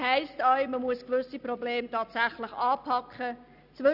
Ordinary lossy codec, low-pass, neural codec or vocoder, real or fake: none; 5.4 kHz; none; real